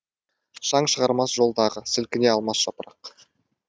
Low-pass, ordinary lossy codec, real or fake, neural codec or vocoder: none; none; real; none